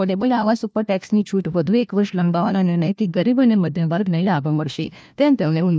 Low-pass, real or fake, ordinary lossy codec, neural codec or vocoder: none; fake; none; codec, 16 kHz, 1 kbps, FunCodec, trained on LibriTTS, 50 frames a second